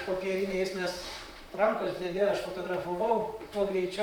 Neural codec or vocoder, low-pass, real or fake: vocoder, 44.1 kHz, 128 mel bands, Pupu-Vocoder; 19.8 kHz; fake